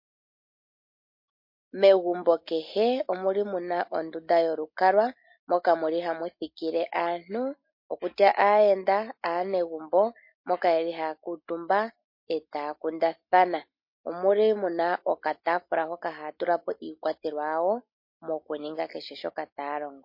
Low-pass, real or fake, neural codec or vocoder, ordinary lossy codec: 5.4 kHz; real; none; MP3, 32 kbps